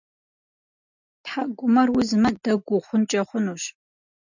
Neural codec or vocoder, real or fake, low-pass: none; real; 7.2 kHz